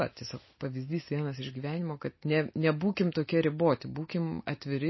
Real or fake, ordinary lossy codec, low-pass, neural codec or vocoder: real; MP3, 24 kbps; 7.2 kHz; none